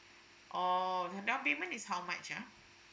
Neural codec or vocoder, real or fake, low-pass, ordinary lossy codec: none; real; none; none